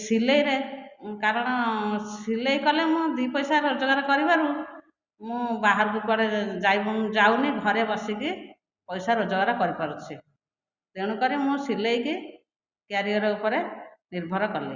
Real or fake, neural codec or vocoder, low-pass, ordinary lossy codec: real; none; 7.2 kHz; Opus, 64 kbps